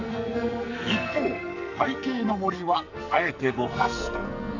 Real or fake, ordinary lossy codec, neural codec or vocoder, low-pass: fake; none; codec, 44.1 kHz, 2.6 kbps, SNAC; 7.2 kHz